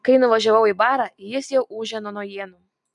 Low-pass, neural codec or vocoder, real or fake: 10.8 kHz; none; real